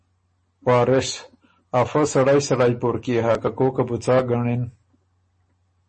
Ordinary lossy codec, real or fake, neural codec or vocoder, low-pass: MP3, 32 kbps; real; none; 9.9 kHz